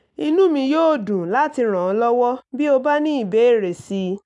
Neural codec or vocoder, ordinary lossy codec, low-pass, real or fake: none; none; 10.8 kHz; real